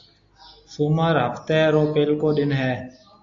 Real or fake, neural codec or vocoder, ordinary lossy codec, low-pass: real; none; AAC, 64 kbps; 7.2 kHz